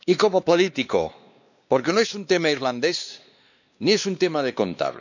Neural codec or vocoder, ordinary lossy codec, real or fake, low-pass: codec, 16 kHz, 2 kbps, X-Codec, WavLM features, trained on Multilingual LibriSpeech; none; fake; 7.2 kHz